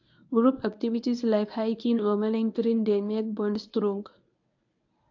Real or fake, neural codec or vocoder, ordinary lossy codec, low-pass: fake; codec, 24 kHz, 0.9 kbps, WavTokenizer, medium speech release version 1; AAC, 48 kbps; 7.2 kHz